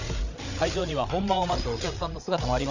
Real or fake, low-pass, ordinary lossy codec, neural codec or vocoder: fake; 7.2 kHz; none; codec, 16 kHz, 8 kbps, FreqCodec, larger model